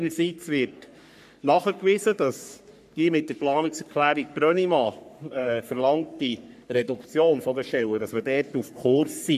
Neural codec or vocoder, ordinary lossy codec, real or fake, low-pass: codec, 44.1 kHz, 3.4 kbps, Pupu-Codec; AAC, 96 kbps; fake; 14.4 kHz